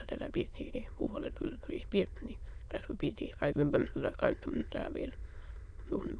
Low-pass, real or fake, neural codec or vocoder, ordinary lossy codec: 9.9 kHz; fake; autoencoder, 22.05 kHz, a latent of 192 numbers a frame, VITS, trained on many speakers; none